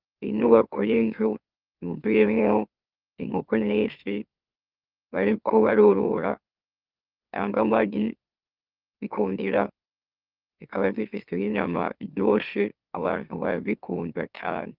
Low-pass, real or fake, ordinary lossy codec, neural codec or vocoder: 5.4 kHz; fake; Opus, 32 kbps; autoencoder, 44.1 kHz, a latent of 192 numbers a frame, MeloTTS